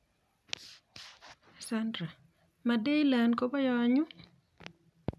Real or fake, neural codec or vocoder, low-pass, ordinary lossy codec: real; none; none; none